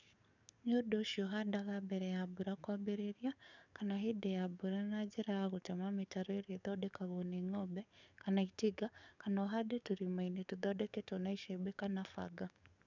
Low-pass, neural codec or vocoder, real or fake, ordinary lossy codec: 7.2 kHz; codec, 44.1 kHz, 7.8 kbps, DAC; fake; none